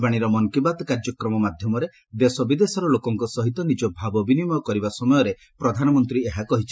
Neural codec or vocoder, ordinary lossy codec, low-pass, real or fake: none; none; none; real